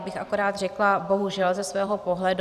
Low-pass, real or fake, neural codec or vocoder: 14.4 kHz; fake; vocoder, 44.1 kHz, 128 mel bands every 512 samples, BigVGAN v2